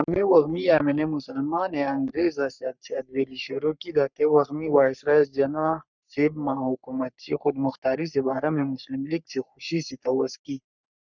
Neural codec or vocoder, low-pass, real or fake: codec, 44.1 kHz, 3.4 kbps, Pupu-Codec; 7.2 kHz; fake